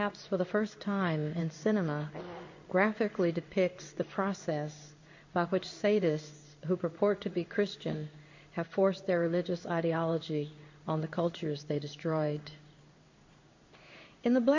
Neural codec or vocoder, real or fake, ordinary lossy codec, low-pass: codec, 16 kHz in and 24 kHz out, 1 kbps, XY-Tokenizer; fake; MP3, 48 kbps; 7.2 kHz